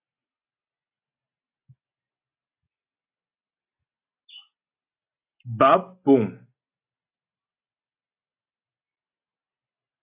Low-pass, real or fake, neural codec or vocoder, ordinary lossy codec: 3.6 kHz; real; none; AAC, 32 kbps